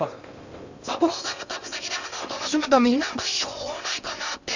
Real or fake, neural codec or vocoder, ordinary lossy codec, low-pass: fake; codec, 16 kHz in and 24 kHz out, 0.6 kbps, FocalCodec, streaming, 2048 codes; none; 7.2 kHz